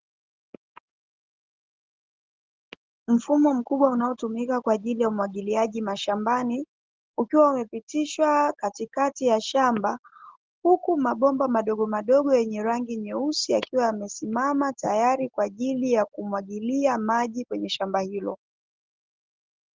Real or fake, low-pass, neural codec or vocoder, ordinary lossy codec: real; 7.2 kHz; none; Opus, 16 kbps